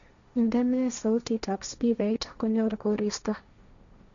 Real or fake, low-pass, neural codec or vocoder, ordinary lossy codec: fake; 7.2 kHz; codec, 16 kHz, 1.1 kbps, Voila-Tokenizer; none